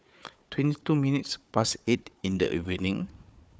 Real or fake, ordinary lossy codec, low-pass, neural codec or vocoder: fake; none; none; codec, 16 kHz, 4 kbps, FunCodec, trained on Chinese and English, 50 frames a second